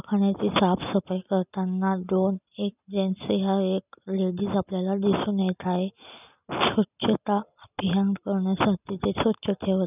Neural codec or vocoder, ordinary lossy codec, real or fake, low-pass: none; none; real; 3.6 kHz